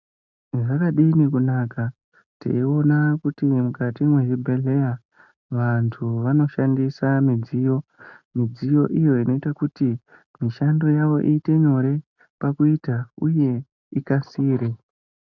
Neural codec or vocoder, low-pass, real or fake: none; 7.2 kHz; real